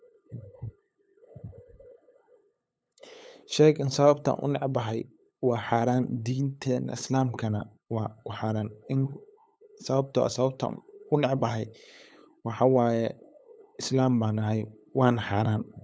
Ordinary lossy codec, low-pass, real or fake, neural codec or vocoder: none; none; fake; codec, 16 kHz, 8 kbps, FunCodec, trained on LibriTTS, 25 frames a second